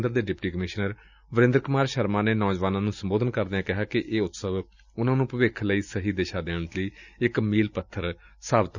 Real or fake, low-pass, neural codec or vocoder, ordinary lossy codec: real; 7.2 kHz; none; none